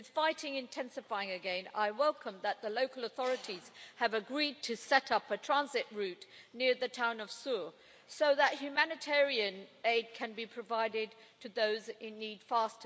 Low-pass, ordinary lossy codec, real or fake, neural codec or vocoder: none; none; real; none